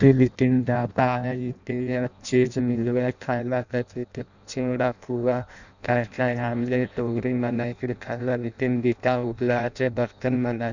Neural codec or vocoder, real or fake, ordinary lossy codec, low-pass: codec, 16 kHz in and 24 kHz out, 0.6 kbps, FireRedTTS-2 codec; fake; none; 7.2 kHz